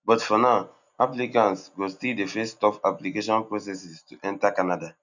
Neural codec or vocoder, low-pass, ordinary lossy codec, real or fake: none; 7.2 kHz; none; real